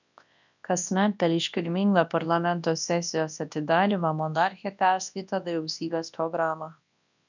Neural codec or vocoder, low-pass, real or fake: codec, 24 kHz, 0.9 kbps, WavTokenizer, large speech release; 7.2 kHz; fake